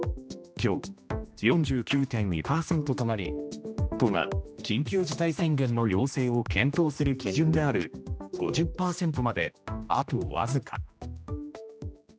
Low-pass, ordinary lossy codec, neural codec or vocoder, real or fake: none; none; codec, 16 kHz, 1 kbps, X-Codec, HuBERT features, trained on general audio; fake